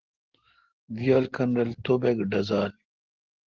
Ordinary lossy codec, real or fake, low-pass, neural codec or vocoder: Opus, 16 kbps; real; 7.2 kHz; none